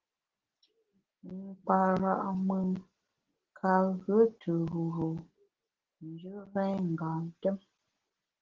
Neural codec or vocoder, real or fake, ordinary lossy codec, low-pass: none; real; Opus, 16 kbps; 7.2 kHz